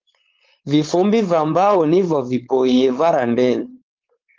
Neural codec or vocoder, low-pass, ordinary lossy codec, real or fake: codec, 16 kHz, 4.8 kbps, FACodec; 7.2 kHz; Opus, 24 kbps; fake